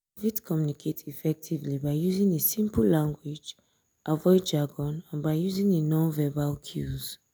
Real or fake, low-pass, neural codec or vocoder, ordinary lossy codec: real; none; none; none